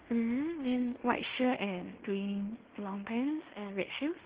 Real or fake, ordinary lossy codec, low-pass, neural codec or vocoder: fake; Opus, 16 kbps; 3.6 kHz; codec, 16 kHz in and 24 kHz out, 0.9 kbps, LongCat-Audio-Codec, fine tuned four codebook decoder